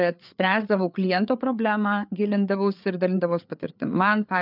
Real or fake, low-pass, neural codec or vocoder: fake; 5.4 kHz; codec, 16 kHz, 4 kbps, FreqCodec, larger model